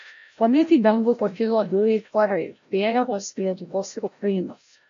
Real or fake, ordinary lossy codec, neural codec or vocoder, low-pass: fake; AAC, 64 kbps; codec, 16 kHz, 0.5 kbps, FreqCodec, larger model; 7.2 kHz